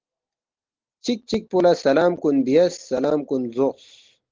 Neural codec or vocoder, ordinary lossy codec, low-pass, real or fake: none; Opus, 16 kbps; 7.2 kHz; real